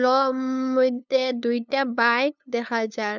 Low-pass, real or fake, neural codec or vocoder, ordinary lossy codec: 7.2 kHz; fake; codec, 16 kHz, 2 kbps, FunCodec, trained on LibriTTS, 25 frames a second; none